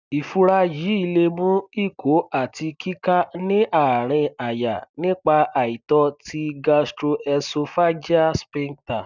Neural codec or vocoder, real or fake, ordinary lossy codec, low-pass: none; real; none; 7.2 kHz